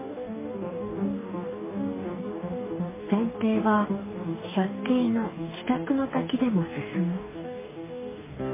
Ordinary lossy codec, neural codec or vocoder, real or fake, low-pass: MP3, 16 kbps; codec, 44.1 kHz, 2.6 kbps, DAC; fake; 3.6 kHz